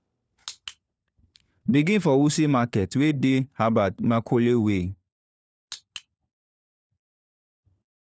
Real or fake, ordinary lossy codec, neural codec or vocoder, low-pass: fake; none; codec, 16 kHz, 4 kbps, FunCodec, trained on LibriTTS, 50 frames a second; none